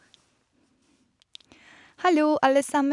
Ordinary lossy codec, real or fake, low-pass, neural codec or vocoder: none; real; 10.8 kHz; none